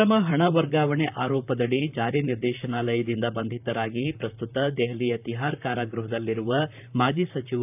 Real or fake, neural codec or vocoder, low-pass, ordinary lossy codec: fake; vocoder, 44.1 kHz, 128 mel bands, Pupu-Vocoder; 3.6 kHz; none